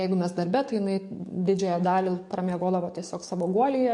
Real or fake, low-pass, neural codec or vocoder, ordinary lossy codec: fake; 10.8 kHz; codec, 44.1 kHz, 7.8 kbps, DAC; MP3, 48 kbps